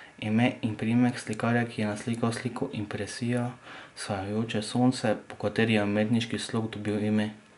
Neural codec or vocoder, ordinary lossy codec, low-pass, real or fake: none; none; 10.8 kHz; real